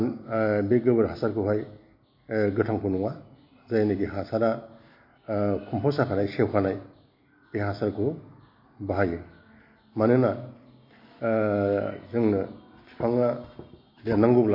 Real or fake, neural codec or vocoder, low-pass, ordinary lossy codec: real; none; 5.4 kHz; MP3, 32 kbps